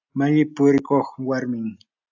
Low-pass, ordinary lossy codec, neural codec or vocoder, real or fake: 7.2 kHz; AAC, 48 kbps; none; real